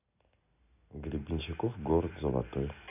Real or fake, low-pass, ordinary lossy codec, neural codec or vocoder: real; 3.6 kHz; none; none